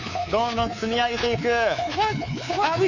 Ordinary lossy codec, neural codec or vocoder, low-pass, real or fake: none; codec, 24 kHz, 3.1 kbps, DualCodec; 7.2 kHz; fake